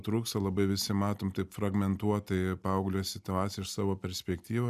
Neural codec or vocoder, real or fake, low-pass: none; real; 14.4 kHz